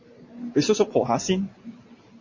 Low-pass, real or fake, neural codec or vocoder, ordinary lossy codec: 7.2 kHz; fake; vocoder, 44.1 kHz, 128 mel bands every 512 samples, BigVGAN v2; MP3, 32 kbps